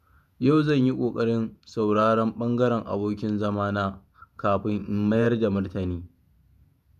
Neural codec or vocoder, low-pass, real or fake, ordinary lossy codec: vocoder, 48 kHz, 128 mel bands, Vocos; 14.4 kHz; fake; none